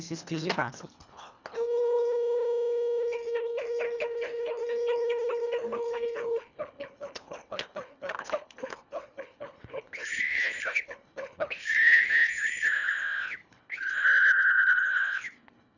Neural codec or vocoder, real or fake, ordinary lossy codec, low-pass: codec, 24 kHz, 1.5 kbps, HILCodec; fake; none; 7.2 kHz